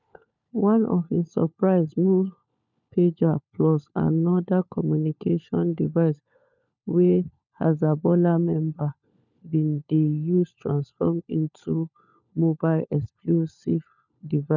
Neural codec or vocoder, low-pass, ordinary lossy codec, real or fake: codec, 16 kHz, 4 kbps, FunCodec, trained on LibriTTS, 50 frames a second; 7.2 kHz; none; fake